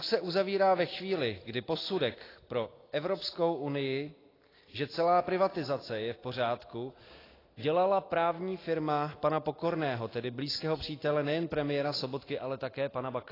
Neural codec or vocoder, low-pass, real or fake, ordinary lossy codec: none; 5.4 kHz; real; AAC, 24 kbps